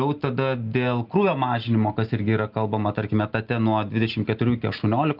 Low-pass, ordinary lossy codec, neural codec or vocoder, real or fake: 5.4 kHz; Opus, 32 kbps; none; real